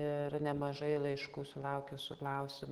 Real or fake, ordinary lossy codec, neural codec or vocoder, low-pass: real; Opus, 16 kbps; none; 19.8 kHz